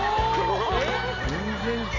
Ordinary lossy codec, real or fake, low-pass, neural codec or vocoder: none; real; 7.2 kHz; none